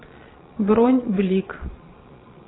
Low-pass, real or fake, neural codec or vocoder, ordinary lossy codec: 7.2 kHz; fake; vocoder, 22.05 kHz, 80 mel bands, Vocos; AAC, 16 kbps